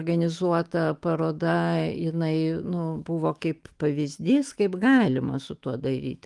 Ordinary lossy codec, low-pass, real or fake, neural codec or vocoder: Opus, 24 kbps; 10.8 kHz; fake; autoencoder, 48 kHz, 128 numbers a frame, DAC-VAE, trained on Japanese speech